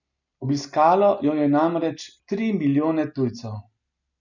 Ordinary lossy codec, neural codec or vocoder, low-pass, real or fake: none; none; 7.2 kHz; real